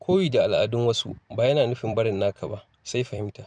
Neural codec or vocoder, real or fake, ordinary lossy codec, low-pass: vocoder, 44.1 kHz, 128 mel bands every 256 samples, BigVGAN v2; fake; none; 9.9 kHz